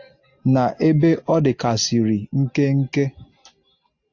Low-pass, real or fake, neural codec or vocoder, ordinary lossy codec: 7.2 kHz; real; none; AAC, 48 kbps